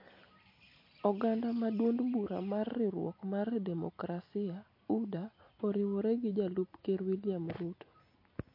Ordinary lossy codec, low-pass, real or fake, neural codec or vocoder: MP3, 32 kbps; 5.4 kHz; real; none